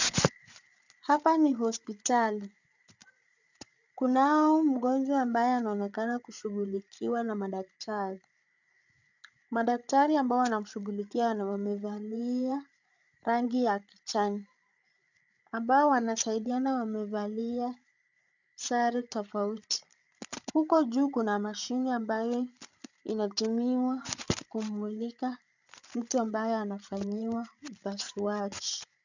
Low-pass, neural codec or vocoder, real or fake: 7.2 kHz; codec, 16 kHz, 8 kbps, FreqCodec, larger model; fake